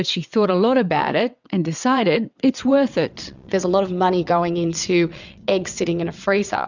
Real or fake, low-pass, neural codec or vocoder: fake; 7.2 kHz; vocoder, 22.05 kHz, 80 mel bands, WaveNeXt